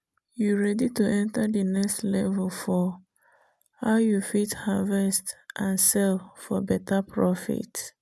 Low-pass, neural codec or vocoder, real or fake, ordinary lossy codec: none; none; real; none